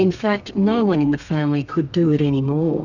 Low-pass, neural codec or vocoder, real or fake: 7.2 kHz; codec, 32 kHz, 1.9 kbps, SNAC; fake